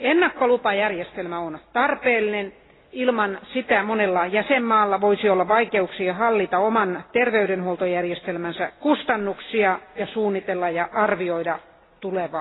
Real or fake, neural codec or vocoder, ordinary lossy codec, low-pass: real; none; AAC, 16 kbps; 7.2 kHz